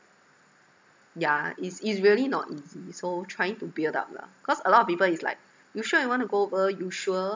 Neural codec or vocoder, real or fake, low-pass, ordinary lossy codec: none; real; none; none